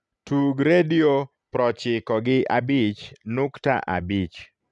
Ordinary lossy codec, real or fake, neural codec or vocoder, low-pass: none; real; none; 10.8 kHz